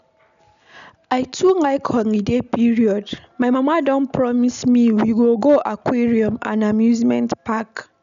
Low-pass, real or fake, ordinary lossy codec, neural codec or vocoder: 7.2 kHz; real; none; none